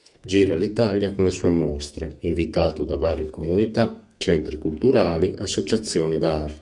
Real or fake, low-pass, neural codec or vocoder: fake; 10.8 kHz; codec, 32 kHz, 1.9 kbps, SNAC